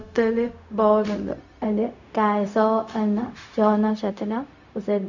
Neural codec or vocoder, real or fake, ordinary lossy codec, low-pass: codec, 16 kHz, 0.4 kbps, LongCat-Audio-Codec; fake; none; 7.2 kHz